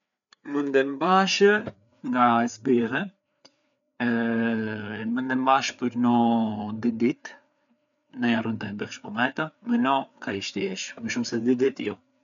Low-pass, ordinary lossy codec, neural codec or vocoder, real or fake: 7.2 kHz; none; codec, 16 kHz, 4 kbps, FreqCodec, larger model; fake